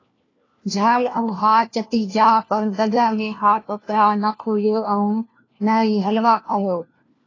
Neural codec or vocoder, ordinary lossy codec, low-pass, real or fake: codec, 16 kHz, 1 kbps, FunCodec, trained on LibriTTS, 50 frames a second; AAC, 32 kbps; 7.2 kHz; fake